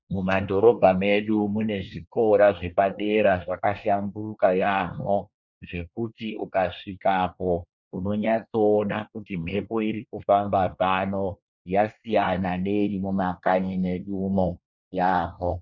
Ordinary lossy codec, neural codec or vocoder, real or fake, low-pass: Opus, 64 kbps; codec, 24 kHz, 1 kbps, SNAC; fake; 7.2 kHz